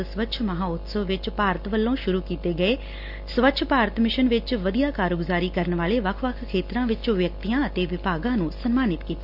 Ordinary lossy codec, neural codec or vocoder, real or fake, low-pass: none; none; real; 5.4 kHz